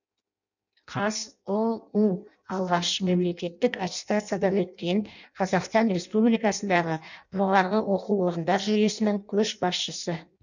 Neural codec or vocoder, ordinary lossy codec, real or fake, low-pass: codec, 16 kHz in and 24 kHz out, 0.6 kbps, FireRedTTS-2 codec; none; fake; 7.2 kHz